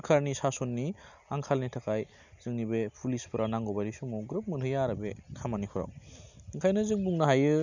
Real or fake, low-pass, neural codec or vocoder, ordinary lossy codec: real; 7.2 kHz; none; none